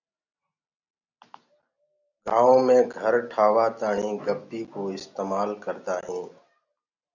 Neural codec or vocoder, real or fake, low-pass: none; real; 7.2 kHz